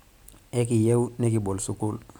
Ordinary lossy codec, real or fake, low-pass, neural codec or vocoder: none; real; none; none